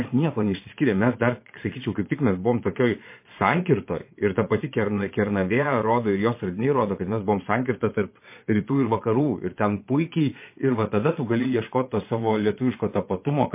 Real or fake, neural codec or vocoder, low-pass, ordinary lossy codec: fake; vocoder, 22.05 kHz, 80 mel bands, Vocos; 3.6 kHz; MP3, 24 kbps